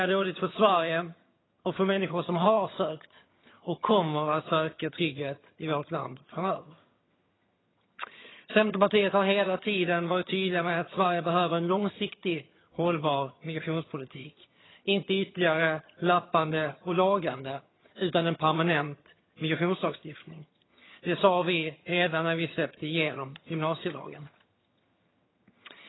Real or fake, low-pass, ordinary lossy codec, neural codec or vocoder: fake; 7.2 kHz; AAC, 16 kbps; vocoder, 22.05 kHz, 80 mel bands, HiFi-GAN